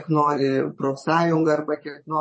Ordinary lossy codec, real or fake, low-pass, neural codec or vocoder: MP3, 32 kbps; fake; 9.9 kHz; vocoder, 22.05 kHz, 80 mel bands, Vocos